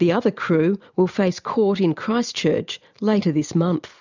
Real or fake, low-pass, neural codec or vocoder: real; 7.2 kHz; none